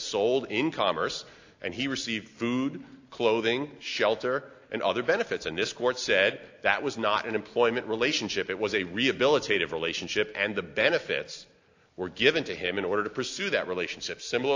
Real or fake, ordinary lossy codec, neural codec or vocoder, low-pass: real; AAC, 48 kbps; none; 7.2 kHz